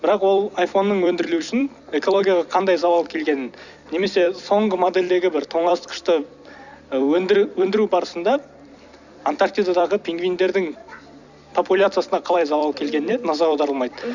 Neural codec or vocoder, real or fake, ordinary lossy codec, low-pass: vocoder, 44.1 kHz, 128 mel bands every 256 samples, BigVGAN v2; fake; none; 7.2 kHz